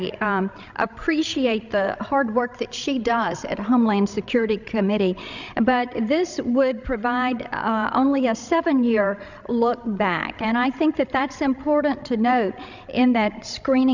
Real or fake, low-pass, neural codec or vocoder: fake; 7.2 kHz; codec, 16 kHz, 16 kbps, FreqCodec, larger model